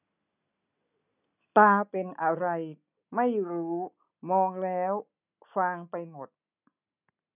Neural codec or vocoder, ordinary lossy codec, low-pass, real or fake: none; none; 3.6 kHz; real